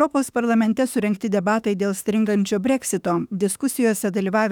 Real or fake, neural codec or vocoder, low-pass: fake; autoencoder, 48 kHz, 32 numbers a frame, DAC-VAE, trained on Japanese speech; 19.8 kHz